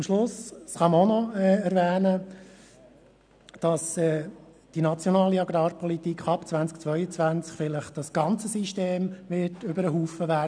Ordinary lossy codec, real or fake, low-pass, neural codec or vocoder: none; real; 9.9 kHz; none